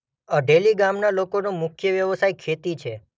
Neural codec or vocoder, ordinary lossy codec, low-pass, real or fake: none; none; none; real